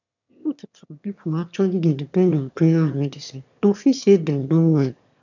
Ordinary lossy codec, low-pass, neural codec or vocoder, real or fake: none; 7.2 kHz; autoencoder, 22.05 kHz, a latent of 192 numbers a frame, VITS, trained on one speaker; fake